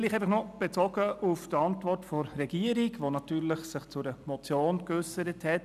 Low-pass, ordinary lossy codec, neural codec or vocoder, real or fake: 14.4 kHz; none; none; real